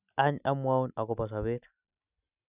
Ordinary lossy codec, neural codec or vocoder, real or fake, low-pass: none; none; real; 3.6 kHz